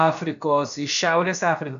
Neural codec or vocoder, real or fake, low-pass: codec, 16 kHz, about 1 kbps, DyCAST, with the encoder's durations; fake; 7.2 kHz